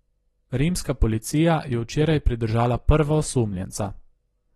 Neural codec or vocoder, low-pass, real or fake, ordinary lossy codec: none; 19.8 kHz; real; AAC, 32 kbps